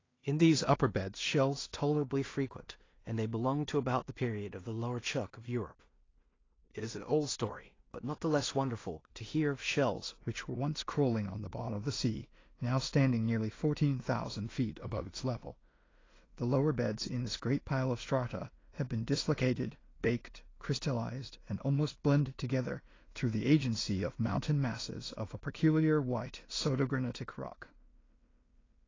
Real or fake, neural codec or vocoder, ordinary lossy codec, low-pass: fake; codec, 16 kHz in and 24 kHz out, 0.4 kbps, LongCat-Audio-Codec, two codebook decoder; AAC, 32 kbps; 7.2 kHz